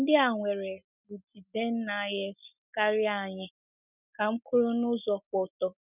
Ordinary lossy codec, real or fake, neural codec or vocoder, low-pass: none; real; none; 3.6 kHz